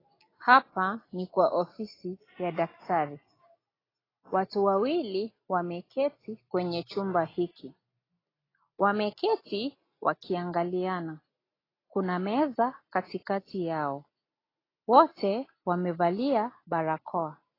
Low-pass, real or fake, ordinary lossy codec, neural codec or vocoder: 5.4 kHz; real; AAC, 24 kbps; none